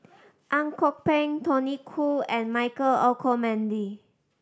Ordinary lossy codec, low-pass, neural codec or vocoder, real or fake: none; none; none; real